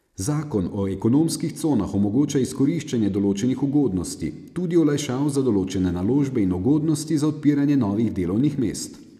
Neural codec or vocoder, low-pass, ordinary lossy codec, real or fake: none; 14.4 kHz; none; real